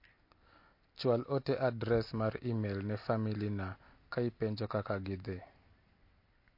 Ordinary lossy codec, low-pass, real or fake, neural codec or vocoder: MP3, 32 kbps; 5.4 kHz; real; none